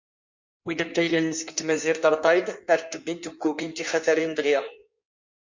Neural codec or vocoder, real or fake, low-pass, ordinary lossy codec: codec, 16 kHz in and 24 kHz out, 1.1 kbps, FireRedTTS-2 codec; fake; 7.2 kHz; MP3, 48 kbps